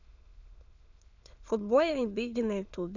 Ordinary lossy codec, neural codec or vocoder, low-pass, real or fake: none; autoencoder, 22.05 kHz, a latent of 192 numbers a frame, VITS, trained on many speakers; 7.2 kHz; fake